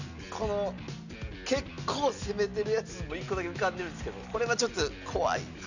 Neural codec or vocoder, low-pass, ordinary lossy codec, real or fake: none; 7.2 kHz; none; real